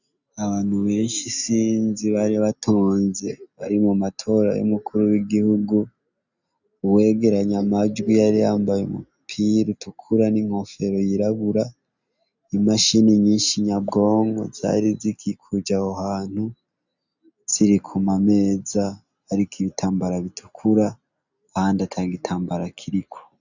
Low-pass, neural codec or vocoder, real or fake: 7.2 kHz; none; real